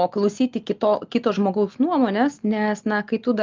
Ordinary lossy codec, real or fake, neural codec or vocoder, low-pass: Opus, 24 kbps; real; none; 7.2 kHz